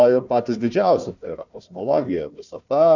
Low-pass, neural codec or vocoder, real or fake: 7.2 kHz; codec, 16 kHz, 1 kbps, FunCodec, trained on Chinese and English, 50 frames a second; fake